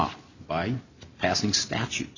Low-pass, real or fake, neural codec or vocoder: 7.2 kHz; real; none